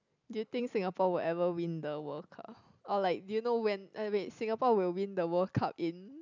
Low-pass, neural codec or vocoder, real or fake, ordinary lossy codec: 7.2 kHz; none; real; none